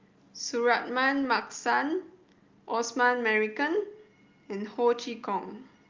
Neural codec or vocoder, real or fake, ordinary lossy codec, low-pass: none; real; Opus, 32 kbps; 7.2 kHz